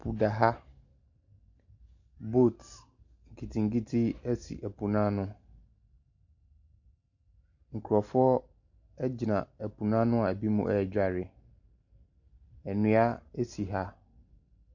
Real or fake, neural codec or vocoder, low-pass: real; none; 7.2 kHz